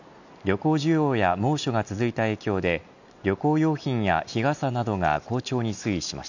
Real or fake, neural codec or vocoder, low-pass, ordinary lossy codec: real; none; 7.2 kHz; none